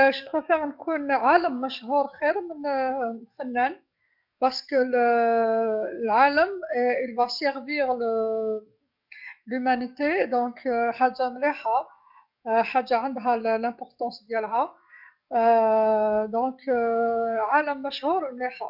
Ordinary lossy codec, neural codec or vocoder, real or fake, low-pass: none; codec, 44.1 kHz, 7.8 kbps, DAC; fake; 5.4 kHz